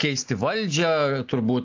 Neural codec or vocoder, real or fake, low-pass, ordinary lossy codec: none; real; 7.2 kHz; AAC, 48 kbps